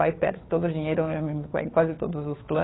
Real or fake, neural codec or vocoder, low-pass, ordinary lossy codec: fake; codec, 16 kHz, 2 kbps, FunCodec, trained on Chinese and English, 25 frames a second; 7.2 kHz; AAC, 16 kbps